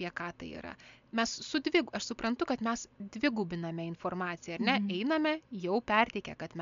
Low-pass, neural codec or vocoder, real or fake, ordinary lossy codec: 7.2 kHz; none; real; MP3, 64 kbps